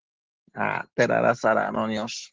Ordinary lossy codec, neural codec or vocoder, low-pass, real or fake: Opus, 16 kbps; none; 7.2 kHz; real